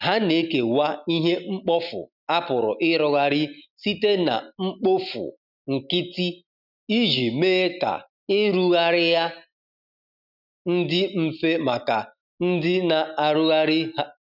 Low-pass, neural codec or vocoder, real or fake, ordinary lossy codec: 5.4 kHz; none; real; none